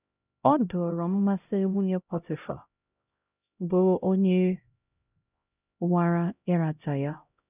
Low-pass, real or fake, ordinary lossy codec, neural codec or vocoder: 3.6 kHz; fake; none; codec, 16 kHz, 0.5 kbps, X-Codec, HuBERT features, trained on LibriSpeech